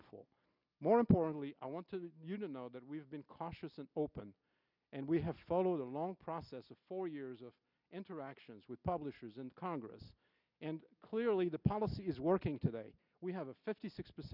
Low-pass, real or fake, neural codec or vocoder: 5.4 kHz; real; none